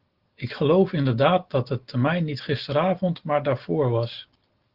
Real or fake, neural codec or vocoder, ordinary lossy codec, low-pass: real; none; Opus, 16 kbps; 5.4 kHz